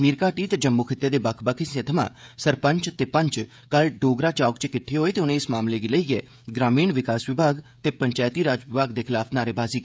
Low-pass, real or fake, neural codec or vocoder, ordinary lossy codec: none; fake; codec, 16 kHz, 16 kbps, FreqCodec, smaller model; none